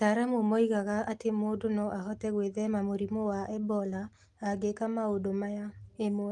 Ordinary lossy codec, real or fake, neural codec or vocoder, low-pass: Opus, 24 kbps; real; none; 10.8 kHz